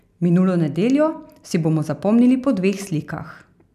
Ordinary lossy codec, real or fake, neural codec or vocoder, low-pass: none; real; none; 14.4 kHz